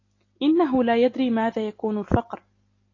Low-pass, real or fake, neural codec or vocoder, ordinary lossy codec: 7.2 kHz; real; none; AAC, 32 kbps